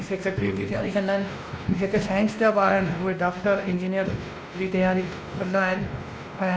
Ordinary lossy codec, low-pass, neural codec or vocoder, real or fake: none; none; codec, 16 kHz, 1 kbps, X-Codec, WavLM features, trained on Multilingual LibriSpeech; fake